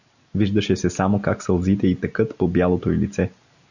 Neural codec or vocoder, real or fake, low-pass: none; real; 7.2 kHz